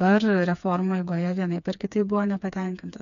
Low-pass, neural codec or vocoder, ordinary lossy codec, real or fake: 7.2 kHz; codec, 16 kHz, 4 kbps, FreqCodec, smaller model; MP3, 96 kbps; fake